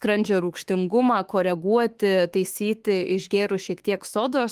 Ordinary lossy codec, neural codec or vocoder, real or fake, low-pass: Opus, 24 kbps; autoencoder, 48 kHz, 32 numbers a frame, DAC-VAE, trained on Japanese speech; fake; 14.4 kHz